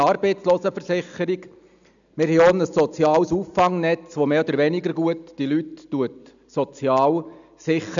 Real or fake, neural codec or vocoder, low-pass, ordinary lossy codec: real; none; 7.2 kHz; none